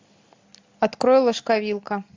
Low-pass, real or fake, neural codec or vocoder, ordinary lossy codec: 7.2 kHz; real; none; AAC, 48 kbps